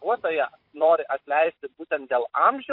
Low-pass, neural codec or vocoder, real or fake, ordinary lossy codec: 5.4 kHz; vocoder, 44.1 kHz, 128 mel bands every 256 samples, BigVGAN v2; fake; MP3, 32 kbps